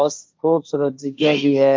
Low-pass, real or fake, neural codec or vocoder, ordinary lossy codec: none; fake; codec, 16 kHz, 1.1 kbps, Voila-Tokenizer; none